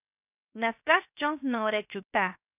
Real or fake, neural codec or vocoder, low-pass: fake; codec, 16 kHz, 0.7 kbps, FocalCodec; 3.6 kHz